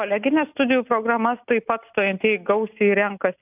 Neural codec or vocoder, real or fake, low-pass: none; real; 3.6 kHz